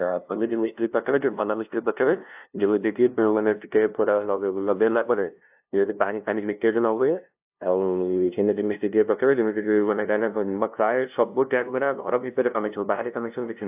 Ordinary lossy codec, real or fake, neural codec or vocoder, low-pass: AAC, 32 kbps; fake; codec, 16 kHz, 0.5 kbps, FunCodec, trained on LibriTTS, 25 frames a second; 3.6 kHz